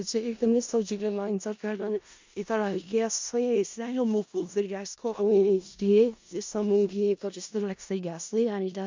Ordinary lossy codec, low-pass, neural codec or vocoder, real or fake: MP3, 64 kbps; 7.2 kHz; codec, 16 kHz in and 24 kHz out, 0.4 kbps, LongCat-Audio-Codec, four codebook decoder; fake